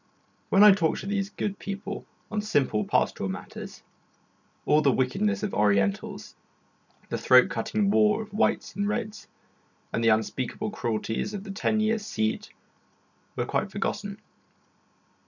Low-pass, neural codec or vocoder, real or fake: 7.2 kHz; none; real